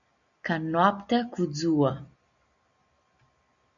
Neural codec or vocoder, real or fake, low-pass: none; real; 7.2 kHz